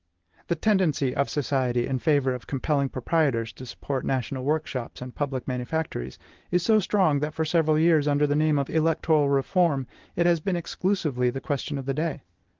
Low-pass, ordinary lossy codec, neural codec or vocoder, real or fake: 7.2 kHz; Opus, 16 kbps; none; real